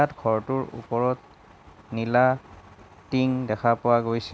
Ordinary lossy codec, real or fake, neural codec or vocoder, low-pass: none; real; none; none